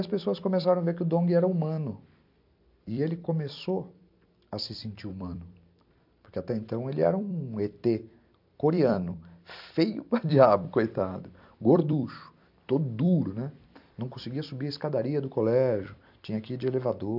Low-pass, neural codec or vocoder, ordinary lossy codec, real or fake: 5.4 kHz; vocoder, 44.1 kHz, 128 mel bands every 256 samples, BigVGAN v2; AAC, 48 kbps; fake